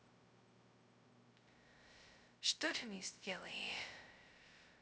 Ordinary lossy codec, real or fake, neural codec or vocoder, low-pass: none; fake; codec, 16 kHz, 0.2 kbps, FocalCodec; none